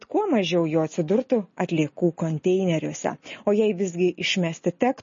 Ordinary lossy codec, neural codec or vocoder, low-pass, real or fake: MP3, 32 kbps; none; 7.2 kHz; real